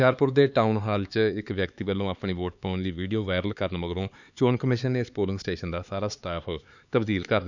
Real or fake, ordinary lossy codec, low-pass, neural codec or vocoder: fake; none; 7.2 kHz; codec, 16 kHz, 4 kbps, X-Codec, HuBERT features, trained on LibriSpeech